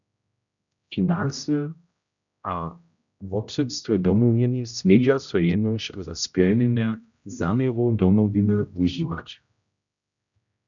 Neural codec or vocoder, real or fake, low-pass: codec, 16 kHz, 0.5 kbps, X-Codec, HuBERT features, trained on general audio; fake; 7.2 kHz